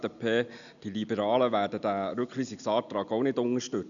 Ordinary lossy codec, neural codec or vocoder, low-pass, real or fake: none; none; 7.2 kHz; real